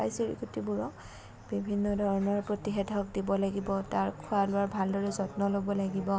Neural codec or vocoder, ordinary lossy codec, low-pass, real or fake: none; none; none; real